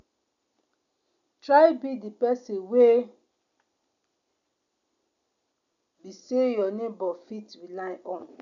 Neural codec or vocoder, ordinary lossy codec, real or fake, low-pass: none; none; real; 7.2 kHz